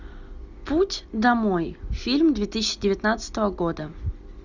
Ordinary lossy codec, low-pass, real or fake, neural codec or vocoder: Opus, 64 kbps; 7.2 kHz; real; none